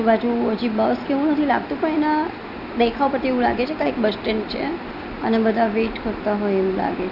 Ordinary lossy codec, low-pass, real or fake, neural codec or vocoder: none; 5.4 kHz; real; none